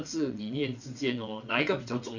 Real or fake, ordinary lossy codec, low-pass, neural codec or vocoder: fake; none; 7.2 kHz; vocoder, 22.05 kHz, 80 mel bands, WaveNeXt